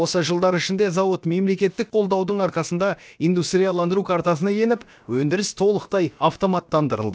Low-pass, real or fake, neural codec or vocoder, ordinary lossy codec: none; fake; codec, 16 kHz, about 1 kbps, DyCAST, with the encoder's durations; none